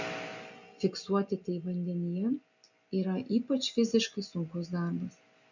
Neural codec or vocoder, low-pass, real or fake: none; 7.2 kHz; real